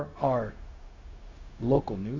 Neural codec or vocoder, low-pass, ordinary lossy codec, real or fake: codec, 16 kHz, 0.4 kbps, LongCat-Audio-Codec; 7.2 kHz; AAC, 32 kbps; fake